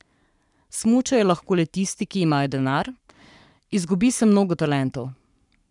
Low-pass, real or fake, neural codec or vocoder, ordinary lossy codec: 10.8 kHz; fake; codec, 44.1 kHz, 7.8 kbps, Pupu-Codec; none